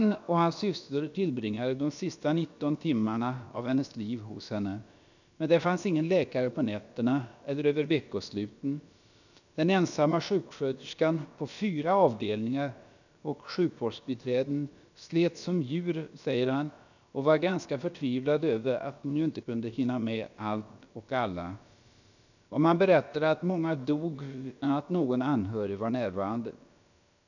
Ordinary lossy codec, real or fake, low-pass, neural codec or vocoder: none; fake; 7.2 kHz; codec, 16 kHz, about 1 kbps, DyCAST, with the encoder's durations